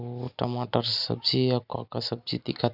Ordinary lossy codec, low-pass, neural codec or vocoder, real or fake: none; 5.4 kHz; none; real